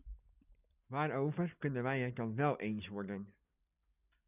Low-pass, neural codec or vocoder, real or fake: 3.6 kHz; none; real